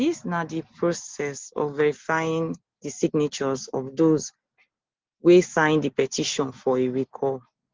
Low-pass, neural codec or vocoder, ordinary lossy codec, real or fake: 7.2 kHz; none; Opus, 16 kbps; real